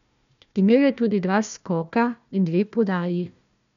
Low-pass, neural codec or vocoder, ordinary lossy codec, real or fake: 7.2 kHz; codec, 16 kHz, 1 kbps, FunCodec, trained on Chinese and English, 50 frames a second; none; fake